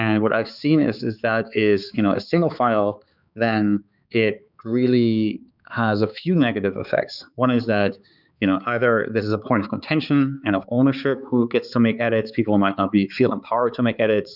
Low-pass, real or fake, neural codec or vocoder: 5.4 kHz; fake; codec, 16 kHz, 4 kbps, X-Codec, HuBERT features, trained on balanced general audio